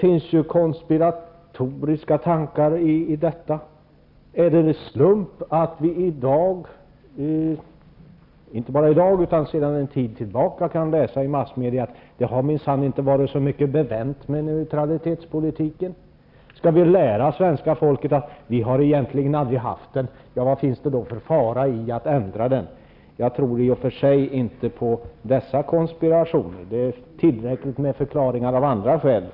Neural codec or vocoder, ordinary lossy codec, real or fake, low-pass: none; none; real; 5.4 kHz